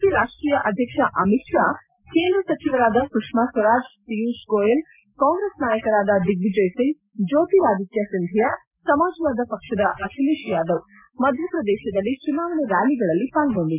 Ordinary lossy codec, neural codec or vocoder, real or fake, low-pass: MP3, 32 kbps; none; real; 3.6 kHz